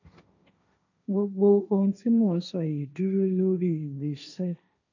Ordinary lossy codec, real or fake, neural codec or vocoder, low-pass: none; fake; codec, 16 kHz, 1.1 kbps, Voila-Tokenizer; 7.2 kHz